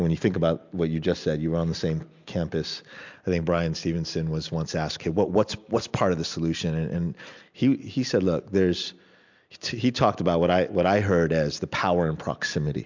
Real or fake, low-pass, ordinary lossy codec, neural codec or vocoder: real; 7.2 kHz; MP3, 64 kbps; none